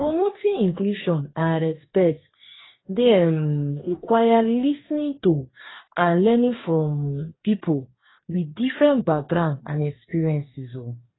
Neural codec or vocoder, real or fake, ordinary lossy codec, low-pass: codec, 44.1 kHz, 2.6 kbps, DAC; fake; AAC, 16 kbps; 7.2 kHz